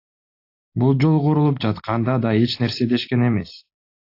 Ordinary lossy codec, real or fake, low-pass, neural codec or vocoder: AAC, 32 kbps; real; 5.4 kHz; none